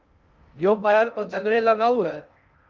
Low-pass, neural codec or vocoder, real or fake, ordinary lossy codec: 7.2 kHz; codec, 16 kHz in and 24 kHz out, 0.6 kbps, FocalCodec, streaming, 2048 codes; fake; Opus, 24 kbps